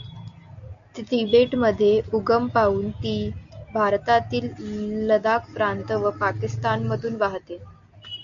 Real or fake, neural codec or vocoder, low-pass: real; none; 7.2 kHz